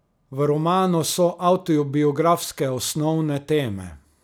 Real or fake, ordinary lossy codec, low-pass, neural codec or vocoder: real; none; none; none